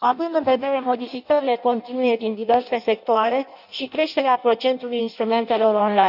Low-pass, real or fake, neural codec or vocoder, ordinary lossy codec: 5.4 kHz; fake; codec, 16 kHz in and 24 kHz out, 0.6 kbps, FireRedTTS-2 codec; none